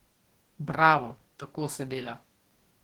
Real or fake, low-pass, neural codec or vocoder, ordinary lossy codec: fake; 19.8 kHz; codec, 44.1 kHz, 2.6 kbps, DAC; Opus, 16 kbps